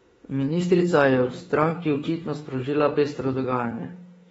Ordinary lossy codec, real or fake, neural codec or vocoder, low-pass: AAC, 24 kbps; fake; autoencoder, 48 kHz, 32 numbers a frame, DAC-VAE, trained on Japanese speech; 19.8 kHz